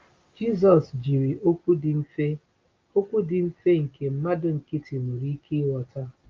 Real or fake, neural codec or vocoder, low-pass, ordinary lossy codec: real; none; 7.2 kHz; Opus, 24 kbps